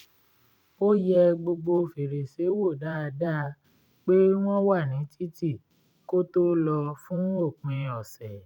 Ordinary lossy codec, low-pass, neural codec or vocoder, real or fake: none; 19.8 kHz; vocoder, 44.1 kHz, 128 mel bands every 512 samples, BigVGAN v2; fake